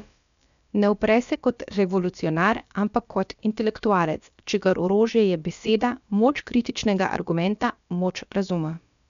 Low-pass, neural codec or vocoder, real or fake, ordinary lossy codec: 7.2 kHz; codec, 16 kHz, about 1 kbps, DyCAST, with the encoder's durations; fake; MP3, 96 kbps